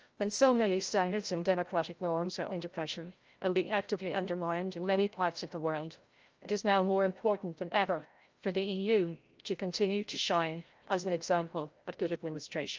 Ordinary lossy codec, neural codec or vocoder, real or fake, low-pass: Opus, 24 kbps; codec, 16 kHz, 0.5 kbps, FreqCodec, larger model; fake; 7.2 kHz